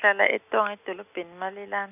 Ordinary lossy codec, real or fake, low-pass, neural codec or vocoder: none; real; 3.6 kHz; none